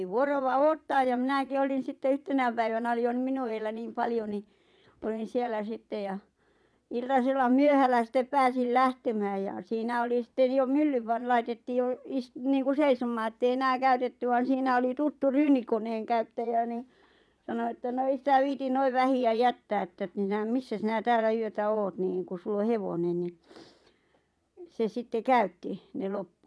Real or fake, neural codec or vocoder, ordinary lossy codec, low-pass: fake; vocoder, 22.05 kHz, 80 mel bands, Vocos; none; none